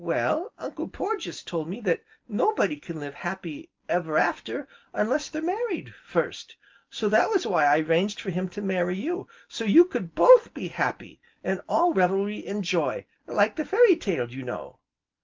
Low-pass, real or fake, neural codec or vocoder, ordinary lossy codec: 7.2 kHz; real; none; Opus, 24 kbps